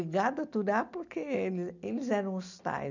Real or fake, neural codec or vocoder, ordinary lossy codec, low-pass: real; none; none; 7.2 kHz